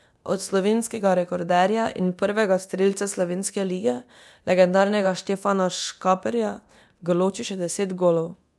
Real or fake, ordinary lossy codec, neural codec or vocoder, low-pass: fake; none; codec, 24 kHz, 0.9 kbps, DualCodec; none